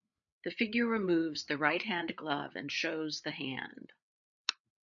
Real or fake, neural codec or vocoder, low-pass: fake; codec, 16 kHz, 8 kbps, FreqCodec, larger model; 7.2 kHz